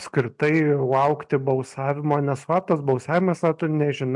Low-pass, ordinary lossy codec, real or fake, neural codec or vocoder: 10.8 kHz; MP3, 64 kbps; real; none